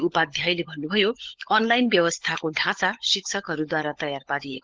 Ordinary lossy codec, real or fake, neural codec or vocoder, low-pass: Opus, 16 kbps; fake; codec, 16 kHz, 16 kbps, FunCodec, trained on LibriTTS, 50 frames a second; 7.2 kHz